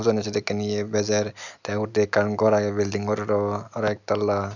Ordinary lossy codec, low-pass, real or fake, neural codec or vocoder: none; 7.2 kHz; real; none